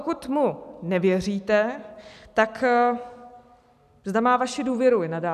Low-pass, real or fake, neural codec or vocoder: 14.4 kHz; real; none